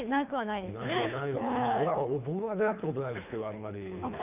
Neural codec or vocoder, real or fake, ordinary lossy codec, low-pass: codec, 24 kHz, 3 kbps, HILCodec; fake; none; 3.6 kHz